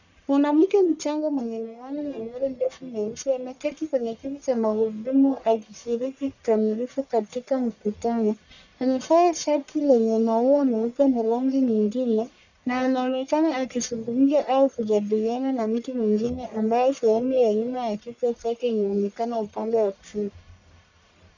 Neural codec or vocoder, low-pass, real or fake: codec, 44.1 kHz, 1.7 kbps, Pupu-Codec; 7.2 kHz; fake